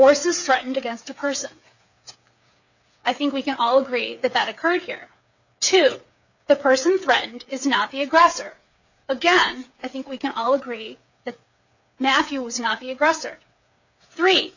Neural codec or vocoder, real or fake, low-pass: codec, 16 kHz, 8 kbps, FreqCodec, smaller model; fake; 7.2 kHz